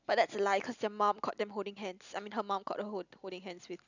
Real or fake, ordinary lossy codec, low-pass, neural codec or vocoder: real; none; 7.2 kHz; none